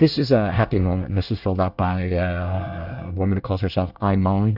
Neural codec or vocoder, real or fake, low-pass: codec, 24 kHz, 1 kbps, SNAC; fake; 5.4 kHz